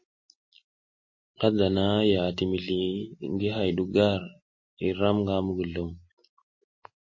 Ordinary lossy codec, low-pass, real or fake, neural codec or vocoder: MP3, 32 kbps; 7.2 kHz; real; none